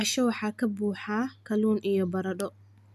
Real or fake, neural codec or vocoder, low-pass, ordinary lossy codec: real; none; 14.4 kHz; none